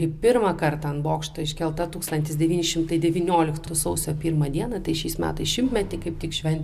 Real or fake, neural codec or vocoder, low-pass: real; none; 14.4 kHz